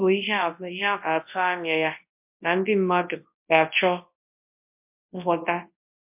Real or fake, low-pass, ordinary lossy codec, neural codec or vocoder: fake; 3.6 kHz; MP3, 32 kbps; codec, 24 kHz, 0.9 kbps, WavTokenizer, large speech release